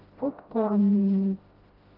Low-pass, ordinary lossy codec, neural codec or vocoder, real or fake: 5.4 kHz; Opus, 16 kbps; codec, 16 kHz, 0.5 kbps, FreqCodec, smaller model; fake